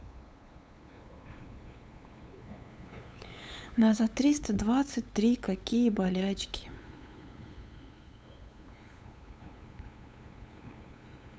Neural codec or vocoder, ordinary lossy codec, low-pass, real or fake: codec, 16 kHz, 8 kbps, FunCodec, trained on LibriTTS, 25 frames a second; none; none; fake